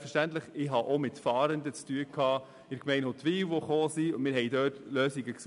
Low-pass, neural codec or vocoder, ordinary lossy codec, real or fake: 10.8 kHz; none; none; real